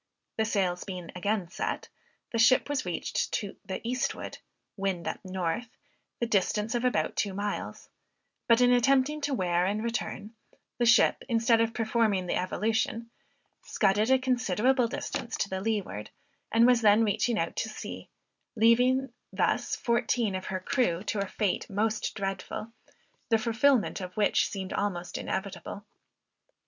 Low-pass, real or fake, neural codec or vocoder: 7.2 kHz; real; none